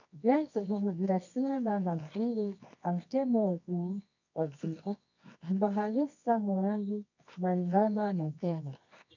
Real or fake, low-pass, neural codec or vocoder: fake; 7.2 kHz; codec, 24 kHz, 0.9 kbps, WavTokenizer, medium music audio release